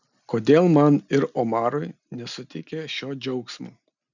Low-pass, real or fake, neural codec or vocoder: 7.2 kHz; real; none